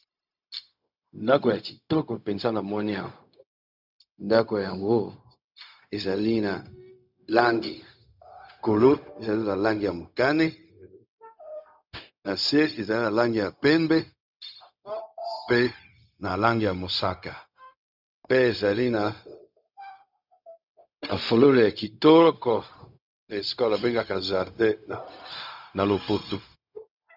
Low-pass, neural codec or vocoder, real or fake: 5.4 kHz; codec, 16 kHz, 0.4 kbps, LongCat-Audio-Codec; fake